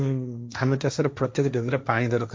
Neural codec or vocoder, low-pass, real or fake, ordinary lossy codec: codec, 16 kHz, 1.1 kbps, Voila-Tokenizer; none; fake; none